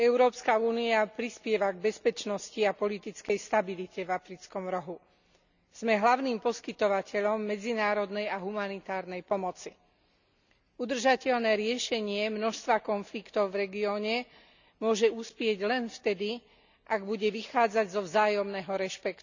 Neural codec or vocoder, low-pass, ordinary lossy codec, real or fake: none; 7.2 kHz; none; real